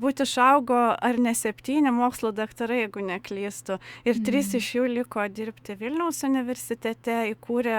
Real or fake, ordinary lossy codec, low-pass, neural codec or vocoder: fake; Opus, 64 kbps; 19.8 kHz; autoencoder, 48 kHz, 128 numbers a frame, DAC-VAE, trained on Japanese speech